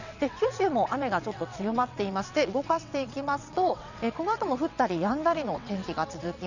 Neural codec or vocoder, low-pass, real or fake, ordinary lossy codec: vocoder, 22.05 kHz, 80 mel bands, WaveNeXt; 7.2 kHz; fake; none